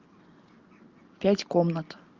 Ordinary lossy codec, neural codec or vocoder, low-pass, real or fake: Opus, 24 kbps; none; 7.2 kHz; real